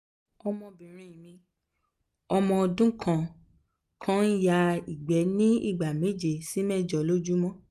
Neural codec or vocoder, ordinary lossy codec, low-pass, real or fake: none; none; 14.4 kHz; real